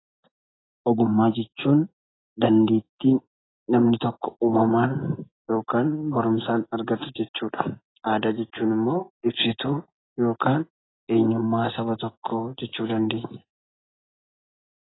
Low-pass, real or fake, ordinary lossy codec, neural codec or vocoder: 7.2 kHz; real; AAC, 16 kbps; none